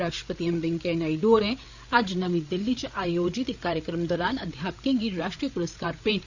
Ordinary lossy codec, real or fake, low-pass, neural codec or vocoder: none; fake; 7.2 kHz; codec, 16 kHz, 8 kbps, FreqCodec, larger model